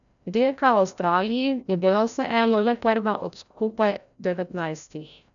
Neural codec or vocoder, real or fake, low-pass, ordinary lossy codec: codec, 16 kHz, 0.5 kbps, FreqCodec, larger model; fake; 7.2 kHz; none